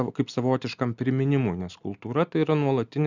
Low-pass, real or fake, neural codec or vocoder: 7.2 kHz; real; none